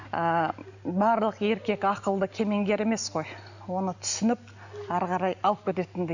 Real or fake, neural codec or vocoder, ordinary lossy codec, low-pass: real; none; none; 7.2 kHz